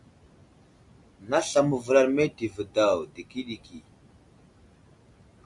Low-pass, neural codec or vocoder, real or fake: 10.8 kHz; none; real